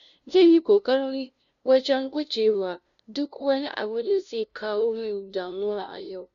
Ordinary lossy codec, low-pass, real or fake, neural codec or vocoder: none; 7.2 kHz; fake; codec, 16 kHz, 0.5 kbps, FunCodec, trained on LibriTTS, 25 frames a second